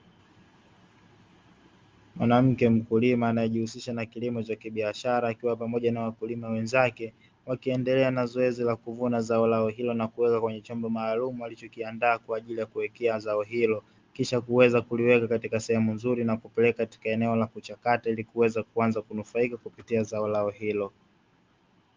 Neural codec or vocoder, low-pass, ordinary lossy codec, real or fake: none; 7.2 kHz; Opus, 32 kbps; real